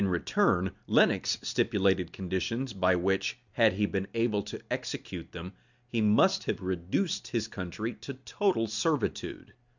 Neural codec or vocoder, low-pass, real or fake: none; 7.2 kHz; real